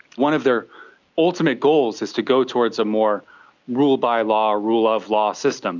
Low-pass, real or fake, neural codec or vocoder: 7.2 kHz; real; none